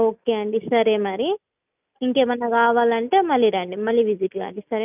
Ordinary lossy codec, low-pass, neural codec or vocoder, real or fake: none; 3.6 kHz; none; real